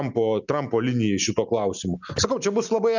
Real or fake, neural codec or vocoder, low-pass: real; none; 7.2 kHz